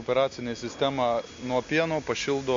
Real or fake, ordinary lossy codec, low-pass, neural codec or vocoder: real; MP3, 48 kbps; 7.2 kHz; none